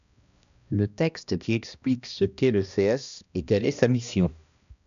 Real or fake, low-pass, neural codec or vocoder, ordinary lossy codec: fake; 7.2 kHz; codec, 16 kHz, 1 kbps, X-Codec, HuBERT features, trained on balanced general audio; AAC, 96 kbps